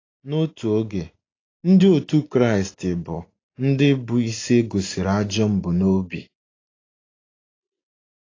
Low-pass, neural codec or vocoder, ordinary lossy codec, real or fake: 7.2 kHz; none; AAC, 32 kbps; real